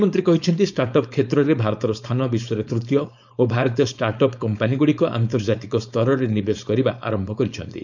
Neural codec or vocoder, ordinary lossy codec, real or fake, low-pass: codec, 16 kHz, 4.8 kbps, FACodec; none; fake; 7.2 kHz